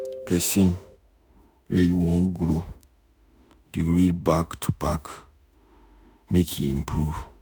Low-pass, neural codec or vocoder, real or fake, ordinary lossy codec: none; autoencoder, 48 kHz, 32 numbers a frame, DAC-VAE, trained on Japanese speech; fake; none